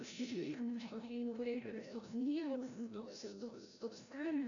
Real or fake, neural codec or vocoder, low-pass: fake; codec, 16 kHz, 0.5 kbps, FreqCodec, larger model; 7.2 kHz